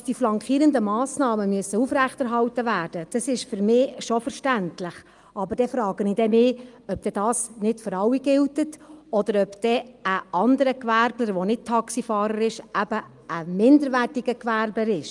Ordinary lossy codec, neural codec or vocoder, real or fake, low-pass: Opus, 32 kbps; none; real; 10.8 kHz